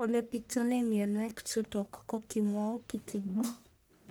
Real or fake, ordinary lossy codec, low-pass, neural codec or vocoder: fake; none; none; codec, 44.1 kHz, 1.7 kbps, Pupu-Codec